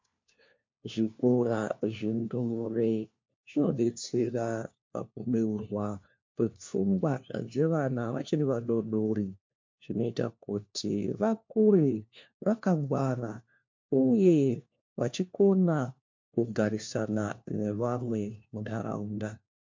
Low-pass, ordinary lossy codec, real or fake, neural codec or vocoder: 7.2 kHz; MP3, 48 kbps; fake; codec, 16 kHz, 1 kbps, FunCodec, trained on LibriTTS, 50 frames a second